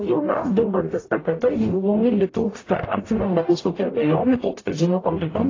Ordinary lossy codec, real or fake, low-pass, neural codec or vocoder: AAC, 32 kbps; fake; 7.2 kHz; codec, 44.1 kHz, 0.9 kbps, DAC